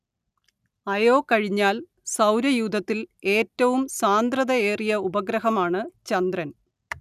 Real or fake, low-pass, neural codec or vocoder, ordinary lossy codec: real; 14.4 kHz; none; none